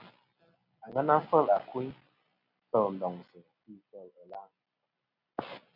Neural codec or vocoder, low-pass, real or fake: none; 5.4 kHz; real